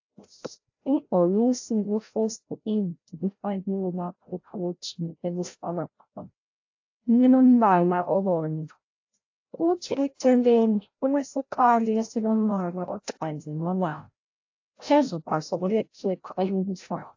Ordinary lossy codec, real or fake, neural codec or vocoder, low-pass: AAC, 48 kbps; fake; codec, 16 kHz, 0.5 kbps, FreqCodec, larger model; 7.2 kHz